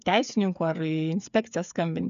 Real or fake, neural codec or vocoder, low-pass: fake; codec, 16 kHz, 8 kbps, FreqCodec, smaller model; 7.2 kHz